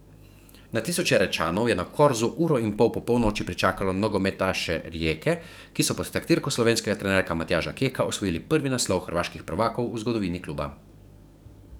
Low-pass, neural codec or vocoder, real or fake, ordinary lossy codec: none; codec, 44.1 kHz, 7.8 kbps, DAC; fake; none